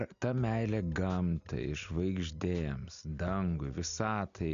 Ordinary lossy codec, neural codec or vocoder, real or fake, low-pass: AAC, 96 kbps; none; real; 7.2 kHz